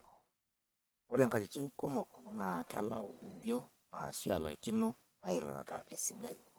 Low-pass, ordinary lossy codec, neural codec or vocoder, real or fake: none; none; codec, 44.1 kHz, 1.7 kbps, Pupu-Codec; fake